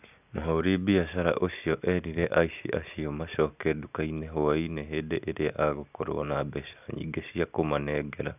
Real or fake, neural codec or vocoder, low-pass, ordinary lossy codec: real; none; 3.6 kHz; none